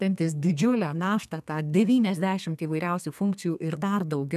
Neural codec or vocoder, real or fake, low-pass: codec, 32 kHz, 1.9 kbps, SNAC; fake; 14.4 kHz